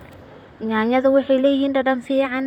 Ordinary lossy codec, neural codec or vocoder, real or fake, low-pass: none; vocoder, 44.1 kHz, 128 mel bands, Pupu-Vocoder; fake; 19.8 kHz